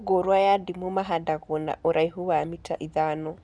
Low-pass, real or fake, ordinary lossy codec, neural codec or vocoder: 9.9 kHz; real; none; none